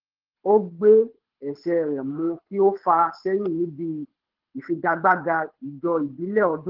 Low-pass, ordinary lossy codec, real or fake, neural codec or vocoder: 5.4 kHz; Opus, 16 kbps; fake; codec, 24 kHz, 6 kbps, HILCodec